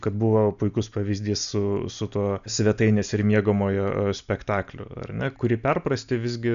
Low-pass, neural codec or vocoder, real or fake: 7.2 kHz; none; real